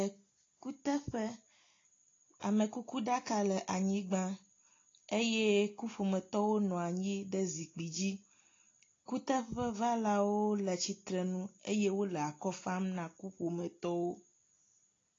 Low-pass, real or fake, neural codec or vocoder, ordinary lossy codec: 7.2 kHz; real; none; AAC, 32 kbps